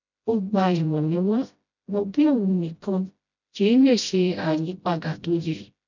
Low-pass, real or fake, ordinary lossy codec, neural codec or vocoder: 7.2 kHz; fake; none; codec, 16 kHz, 0.5 kbps, FreqCodec, smaller model